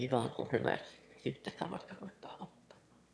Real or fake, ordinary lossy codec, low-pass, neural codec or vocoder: fake; none; none; autoencoder, 22.05 kHz, a latent of 192 numbers a frame, VITS, trained on one speaker